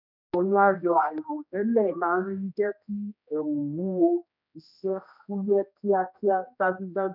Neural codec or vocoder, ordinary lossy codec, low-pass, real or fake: codec, 16 kHz, 1 kbps, X-Codec, HuBERT features, trained on general audio; none; 5.4 kHz; fake